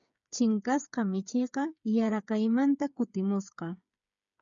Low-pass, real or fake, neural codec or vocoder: 7.2 kHz; fake; codec, 16 kHz, 8 kbps, FreqCodec, smaller model